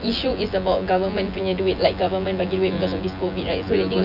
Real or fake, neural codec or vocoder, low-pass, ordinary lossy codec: fake; vocoder, 24 kHz, 100 mel bands, Vocos; 5.4 kHz; none